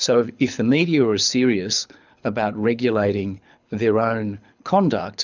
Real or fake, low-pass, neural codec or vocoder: fake; 7.2 kHz; codec, 24 kHz, 6 kbps, HILCodec